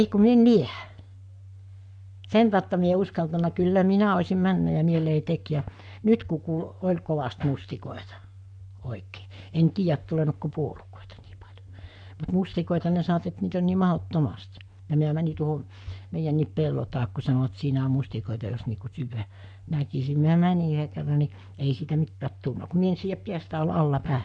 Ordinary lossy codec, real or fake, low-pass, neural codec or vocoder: none; fake; 9.9 kHz; codec, 44.1 kHz, 7.8 kbps, Pupu-Codec